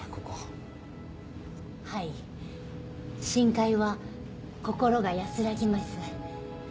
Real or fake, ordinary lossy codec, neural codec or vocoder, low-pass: real; none; none; none